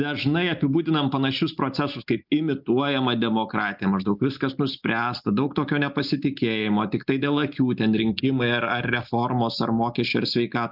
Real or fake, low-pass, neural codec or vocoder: real; 5.4 kHz; none